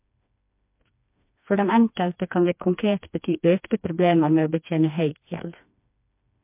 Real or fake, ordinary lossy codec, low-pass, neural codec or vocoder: fake; MP3, 32 kbps; 3.6 kHz; codec, 16 kHz, 2 kbps, FreqCodec, smaller model